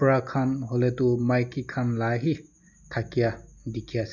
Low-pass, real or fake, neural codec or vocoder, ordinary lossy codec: 7.2 kHz; real; none; none